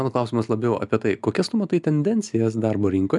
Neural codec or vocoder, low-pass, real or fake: none; 10.8 kHz; real